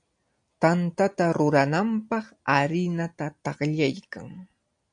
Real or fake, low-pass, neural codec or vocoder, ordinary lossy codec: real; 9.9 kHz; none; MP3, 64 kbps